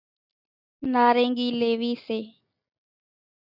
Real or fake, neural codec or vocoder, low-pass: real; none; 5.4 kHz